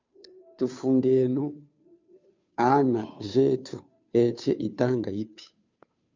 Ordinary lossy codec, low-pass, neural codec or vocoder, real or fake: MP3, 64 kbps; 7.2 kHz; codec, 16 kHz, 2 kbps, FunCodec, trained on Chinese and English, 25 frames a second; fake